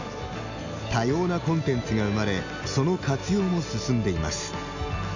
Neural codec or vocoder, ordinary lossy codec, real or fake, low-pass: none; none; real; 7.2 kHz